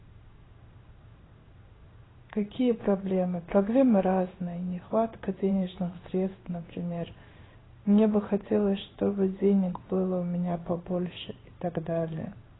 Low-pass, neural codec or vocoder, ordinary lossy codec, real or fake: 7.2 kHz; codec, 16 kHz in and 24 kHz out, 1 kbps, XY-Tokenizer; AAC, 16 kbps; fake